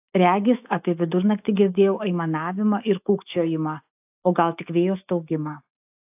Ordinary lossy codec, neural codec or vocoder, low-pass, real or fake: AAC, 32 kbps; autoencoder, 48 kHz, 128 numbers a frame, DAC-VAE, trained on Japanese speech; 3.6 kHz; fake